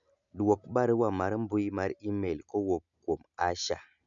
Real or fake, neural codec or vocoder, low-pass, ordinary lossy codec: real; none; 7.2 kHz; none